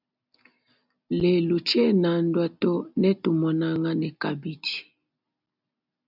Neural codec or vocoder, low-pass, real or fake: none; 5.4 kHz; real